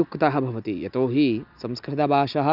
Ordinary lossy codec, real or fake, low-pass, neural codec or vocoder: none; real; 5.4 kHz; none